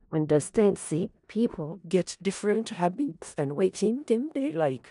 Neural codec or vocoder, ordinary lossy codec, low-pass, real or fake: codec, 16 kHz in and 24 kHz out, 0.4 kbps, LongCat-Audio-Codec, four codebook decoder; none; 10.8 kHz; fake